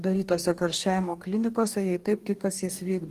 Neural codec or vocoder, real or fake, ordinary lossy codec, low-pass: codec, 44.1 kHz, 2.6 kbps, DAC; fake; Opus, 24 kbps; 14.4 kHz